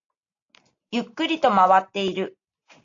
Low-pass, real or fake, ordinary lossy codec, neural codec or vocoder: 7.2 kHz; real; AAC, 48 kbps; none